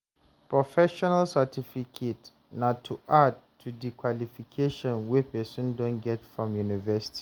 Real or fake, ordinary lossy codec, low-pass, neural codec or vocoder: real; Opus, 32 kbps; 19.8 kHz; none